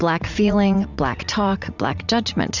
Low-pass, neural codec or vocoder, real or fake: 7.2 kHz; vocoder, 44.1 kHz, 80 mel bands, Vocos; fake